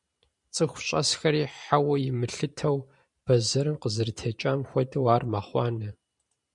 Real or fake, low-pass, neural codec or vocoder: fake; 10.8 kHz; vocoder, 44.1 kHz, 128 mel bands every 512 samples, BigVGAN v2